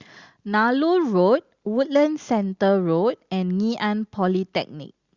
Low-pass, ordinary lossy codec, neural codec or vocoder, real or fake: 7.2 kHz; Opus, 64 kbps; none; real